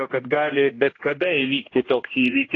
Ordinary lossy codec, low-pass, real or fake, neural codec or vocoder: AAC, 32 kbps; 7.2 kHz; fake; codec, 16 kHz, 1 kbps, X-Codec, HuBERT features, trained on general audio